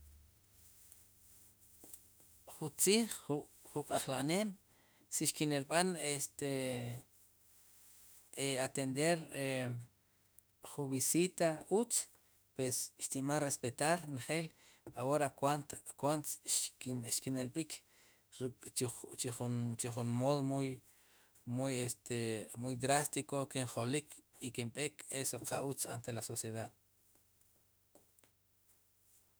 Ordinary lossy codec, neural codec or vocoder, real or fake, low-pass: none; autoencoder, 48 kHz, 32 numbers a frame, DAC-VAE, trained on Japanese speech; fake; none